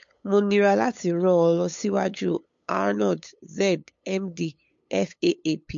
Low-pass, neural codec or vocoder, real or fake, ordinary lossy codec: 7.2 kHz; codec, 16 kHz, 8 kbps, FunCodec, trained on LibriTTS, 25 frames a second; fake; MP3, 48 kbps